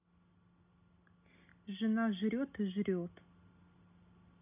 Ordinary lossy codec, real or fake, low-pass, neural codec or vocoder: none; real; 3.6 kHz; none